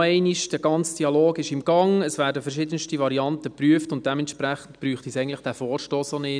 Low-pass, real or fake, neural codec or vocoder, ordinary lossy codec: 9.9 kHz; real; none; none